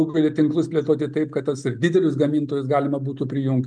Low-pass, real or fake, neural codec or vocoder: 9.9 kHz; real; none